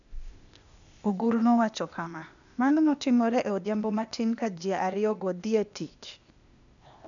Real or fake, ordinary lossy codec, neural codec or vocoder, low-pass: fake; none; codec, 16 kHz, 0.8 kbps, ZipCodec; 7.2 kHz